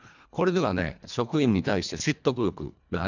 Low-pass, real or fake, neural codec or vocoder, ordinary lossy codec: 7.2 kHz; fake; codec, 24 kHz, 1.5 kbps, HILCodec; none